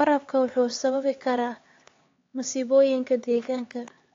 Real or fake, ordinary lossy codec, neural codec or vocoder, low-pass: fake; AAC, 32 kbps; codec, 16 kHz, 4 kbps, X-Codec, HuBERT features, trained on LibriSpeech; 7.2 kHz